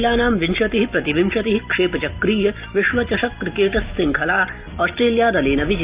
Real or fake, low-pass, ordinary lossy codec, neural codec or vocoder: real; 3.6 kHz; Opus, 24 kbps; none